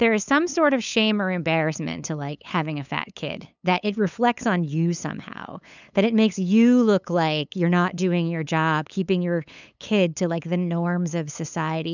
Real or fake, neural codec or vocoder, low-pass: fake; codec, 16 kHz, 8 kbps, FunCodec, trained on LibriTTS, 25 frames a second; 7.2 kHz